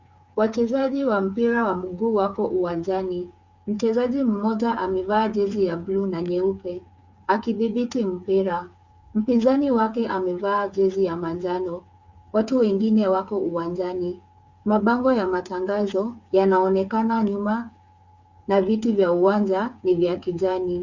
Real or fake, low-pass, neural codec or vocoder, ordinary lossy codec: fake; 7.2 kHz; codec, 16 kHz, 8 kbps, FreqCodec, smaller model; Opus, 64 kbps